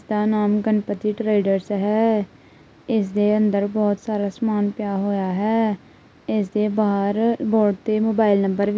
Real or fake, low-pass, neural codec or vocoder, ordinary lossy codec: real; none; none; none